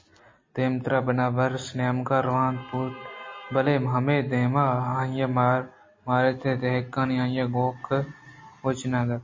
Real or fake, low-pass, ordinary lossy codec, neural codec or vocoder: real; 7.2 kHz; MP3, 32 kbps; none